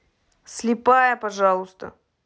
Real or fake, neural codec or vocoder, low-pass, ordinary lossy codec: real; none; none; none